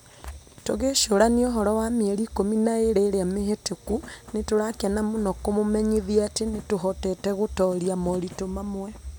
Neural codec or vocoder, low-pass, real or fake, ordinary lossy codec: none; none; real; none